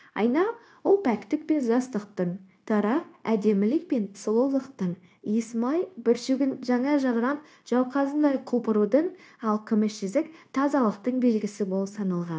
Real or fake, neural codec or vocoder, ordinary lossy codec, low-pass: fake; codec, 16 kHz, 0.9 kbps, LongCat-Audio-Codec; none; none